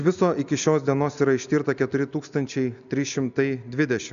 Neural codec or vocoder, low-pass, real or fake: none; 7.2 kHz; real